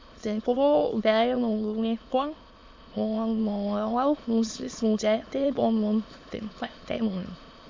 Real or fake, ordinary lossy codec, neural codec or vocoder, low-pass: fake; MP3, 48 kbps; autoencoder, 22.05 kHz, a latent of 192 numbers a frame, VITS, trained on many speakers; 7.2 kHz